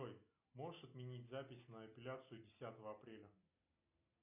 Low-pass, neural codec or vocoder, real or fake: 3.6 kHz; none; real